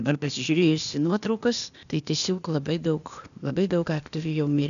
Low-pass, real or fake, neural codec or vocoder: 7.2 kHz; fake; codec, 16 kHz, 0.8 kbps, ZipCodec